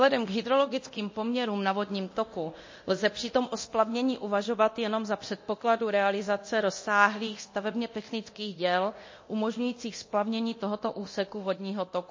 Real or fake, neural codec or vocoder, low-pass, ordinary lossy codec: fake; codec, 24 kHz, 0.9 kbps, DualCodec; 7.2 kHz; MP3, 32 kbps